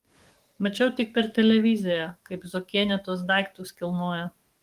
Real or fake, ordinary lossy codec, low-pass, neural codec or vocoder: fake; Opus, 24 kbps; 14.4 kHz; codec, 44.1 kHz, 7.8 kbps, DAC